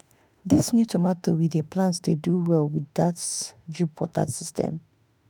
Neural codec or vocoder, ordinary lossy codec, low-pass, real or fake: autoencoder, 48 kHz, 32 numbers a frame, DAC-VAE, trained on Japanese speech; none; none; fake